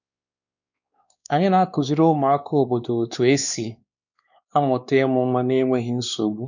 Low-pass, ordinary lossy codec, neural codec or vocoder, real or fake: 7.2 kHz; none; codec, 16 kHz, 2 kbps, X-Codec, WavLM features, trained on Multilingual LibriSpeech; fake